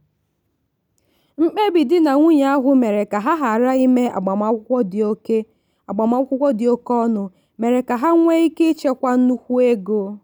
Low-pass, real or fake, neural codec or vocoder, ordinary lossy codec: none; real; none; none